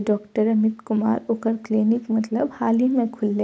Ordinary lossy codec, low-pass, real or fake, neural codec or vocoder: none; none; real; none